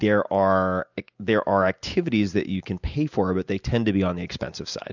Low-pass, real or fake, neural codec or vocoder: 7.2 kHz; real; none